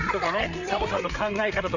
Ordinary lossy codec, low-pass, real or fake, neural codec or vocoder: Opus, 64 kbps; 7.2 kHz; fake; codec, 16 kHz, 8 kbps, FreqCodec, larger model